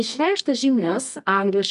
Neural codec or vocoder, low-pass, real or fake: codec, 24 kHz, 0.9 kbps, WavTokenizer, medium music audio release; 10.8 kHz; fake